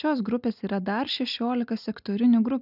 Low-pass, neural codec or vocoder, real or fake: 5.4 kHz; none; real